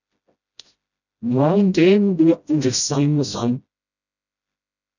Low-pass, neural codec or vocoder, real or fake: 7.2 kHz; codec, 16 kHz, 0.5 kbps, FreqCodec, smaller model; fake